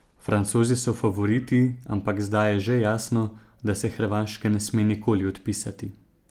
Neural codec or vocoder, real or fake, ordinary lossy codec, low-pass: none; real; Opus, 24 kbps; 19.8 kHz